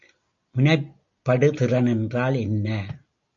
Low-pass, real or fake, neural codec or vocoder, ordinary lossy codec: 7.2 kHz; real; none; AAC, 64 kbps